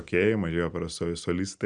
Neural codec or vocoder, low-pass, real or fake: none; 9.9 kHz; real